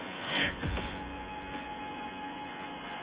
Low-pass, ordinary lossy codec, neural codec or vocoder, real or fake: 3.6 kHz; Opus, 32 kbps; vocoder, 24 kHz, 100 mel bands, Vocos; fake